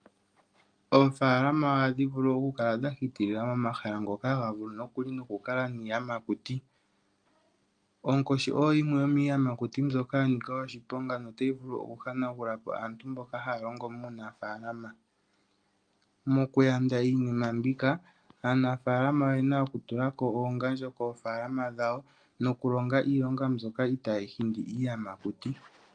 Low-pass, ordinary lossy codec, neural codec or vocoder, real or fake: 9.9 kHz; Opus, 32 kbps; none; real